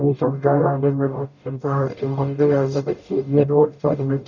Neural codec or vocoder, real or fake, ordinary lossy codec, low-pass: codec, 44.1 kHz, 0.9 kbps, DAC; fake; none; 7.2 kHz